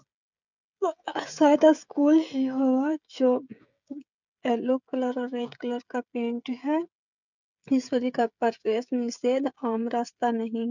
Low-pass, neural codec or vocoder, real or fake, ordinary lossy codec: 7.2 kHz; codec, 16 kHz, 16 kbps, FreqCodec, smaller model; fake; none